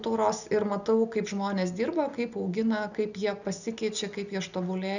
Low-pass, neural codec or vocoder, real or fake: 7.2 kHz; none; real